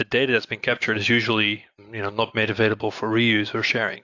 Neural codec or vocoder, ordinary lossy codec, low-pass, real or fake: none; AAC, 48 kbps; 7.2 kHz; real